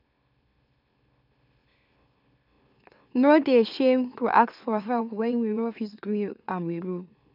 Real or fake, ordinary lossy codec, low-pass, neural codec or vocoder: fake; none; 5.4 kHz; autoencoder, 44.1 kHz, a latent of 192 numbers a frame, MeloTTS